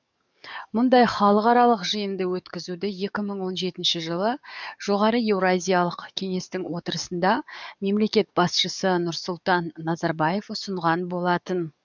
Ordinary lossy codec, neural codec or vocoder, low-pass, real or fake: none; codec, 44.1 kHz, 7.8 kbps, DAC; 7.2 kHz; fake